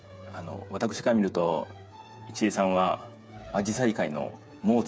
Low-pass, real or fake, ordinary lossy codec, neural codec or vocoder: none; fake; none; codec, 16 kHz, 8 kbps, FreqCodec, smaller model